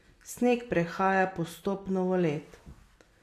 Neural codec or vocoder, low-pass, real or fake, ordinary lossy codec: none; 14.4 kHz; real; AAC, 64 kbps